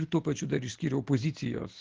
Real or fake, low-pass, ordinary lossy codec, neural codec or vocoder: real; 7.2 kHz; Opus, 16 kbps; none